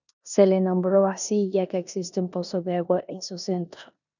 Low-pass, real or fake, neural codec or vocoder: 7.2 kHz; fake; codec, 16 kHz in and 24 kHz out, 0.9 kbps, LongCat-Audio-Codec, four codebook decoder